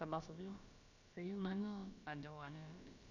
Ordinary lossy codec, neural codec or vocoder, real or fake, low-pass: none; codec, 16 kHz, about 1 kbps, DyCAST, with the encoder's durations; fake; 7.2 kHz